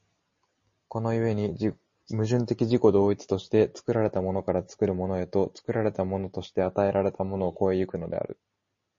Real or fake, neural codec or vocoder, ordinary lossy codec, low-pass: real; none; MP3, 32 kbps; 7.2 kHz